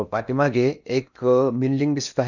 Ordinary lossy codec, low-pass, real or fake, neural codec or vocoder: none; 7.2 kHz; fake; codec, 16 kHz in and 24 kHz out, 0.8 kbps, FocalCodec, streaming, 65536 codes